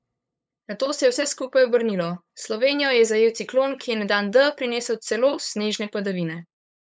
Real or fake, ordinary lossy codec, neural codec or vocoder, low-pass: fake; none; codec, 16 kHz, 8 kbps, FunCodec, trained on LibriTTS, 25 frames a second; none